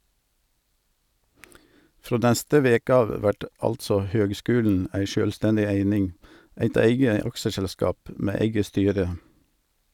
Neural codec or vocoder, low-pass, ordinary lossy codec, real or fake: none; 19.8 kHz; none; real